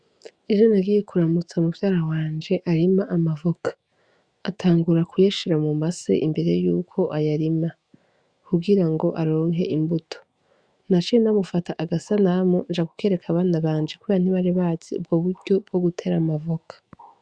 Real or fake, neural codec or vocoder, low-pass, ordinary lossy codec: fake; autoencoder, 48 kHz, 128 numbers a frame, DAC-VAE, trained on Japanese speech; 9.9 kHz; Opus, 64 kbps